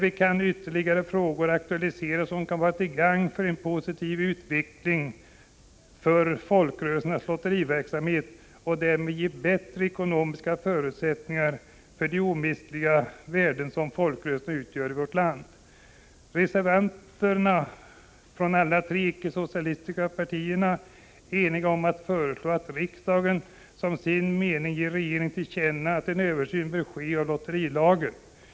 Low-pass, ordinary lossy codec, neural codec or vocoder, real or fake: none; none; none; real